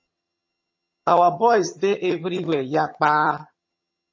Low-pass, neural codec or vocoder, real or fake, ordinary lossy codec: 7.2 kHz; vocoder, 22.05 kHz, 80 mel bands, HiFi-GAN; fake; MP3, 32 kbps